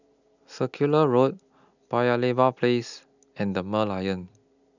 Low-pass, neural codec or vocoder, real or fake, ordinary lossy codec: 7.2 kHz; none; real; none